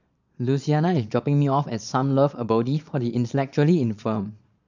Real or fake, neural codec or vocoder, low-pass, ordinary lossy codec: fake; vocoder, 22.05 kHz, 80 mel bands, Vocos; 7.2 kHz; none